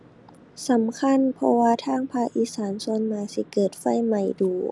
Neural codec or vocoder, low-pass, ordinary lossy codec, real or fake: none; none; none; real